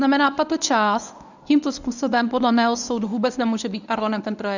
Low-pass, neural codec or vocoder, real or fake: 7.2 kHz; codec, 24 kHz, 0.9 kbps, WavTokenizer, medium speech release version 1; fake